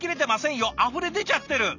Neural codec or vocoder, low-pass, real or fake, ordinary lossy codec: none; 7.2 kHz; real; none